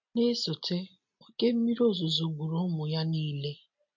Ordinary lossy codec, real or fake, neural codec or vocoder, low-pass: MP3, 48 kbps; real; none; 7.2 kHz